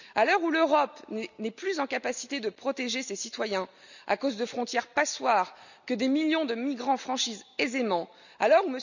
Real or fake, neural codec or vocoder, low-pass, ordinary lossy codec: real; none; 7.2 kHz; none